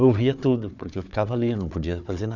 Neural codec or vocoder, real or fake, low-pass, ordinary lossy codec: codec, 16 kHz, 4 kbps, FunCodec, trained on Chinese and English, 50 frames a second; fake; 7.2 kHz; none